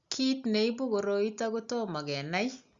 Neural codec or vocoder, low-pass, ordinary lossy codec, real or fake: none; 7.2 kHz; Opus, 64 kbps; real